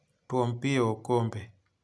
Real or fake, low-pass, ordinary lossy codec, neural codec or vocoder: real; none; none; none